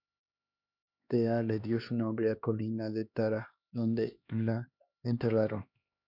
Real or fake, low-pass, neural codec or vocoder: fake; 5.4 kHz; codec, 16 kHz, 2 kbps, X-Codec, HuBERT features, trained on LibriSpeech